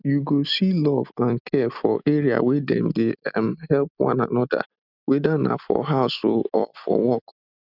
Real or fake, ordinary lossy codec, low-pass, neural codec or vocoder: real; none; 5.4 kHz; none